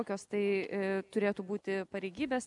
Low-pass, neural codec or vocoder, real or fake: 10.8 kHz; none; real